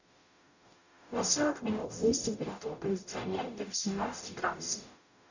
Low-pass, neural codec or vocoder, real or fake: 7.2 kHz; codec, 44.1 kHz, 0.9 kbps, DAC; fake